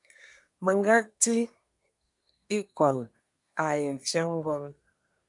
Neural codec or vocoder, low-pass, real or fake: codec, 24 kHz, 1 kbps, SNAC; 10.8 kHz; fake